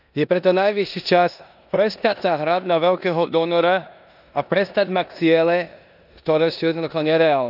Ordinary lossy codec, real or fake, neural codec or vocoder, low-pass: none; fake; codec, 16 kHz in and 24 kHz out, 0.9 kbps, LongCat-Audio-Codec, four codebook decoder; 5.4 kHz